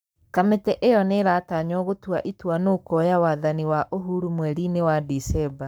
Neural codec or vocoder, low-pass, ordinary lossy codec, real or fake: codec, 44.1 kHz, 7.8 kbps, Pupu-Codec; none; none; fake